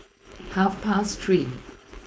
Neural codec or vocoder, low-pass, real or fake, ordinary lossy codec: codec, 16 kHz, 4.8 kbps, FACodec; none; fake; none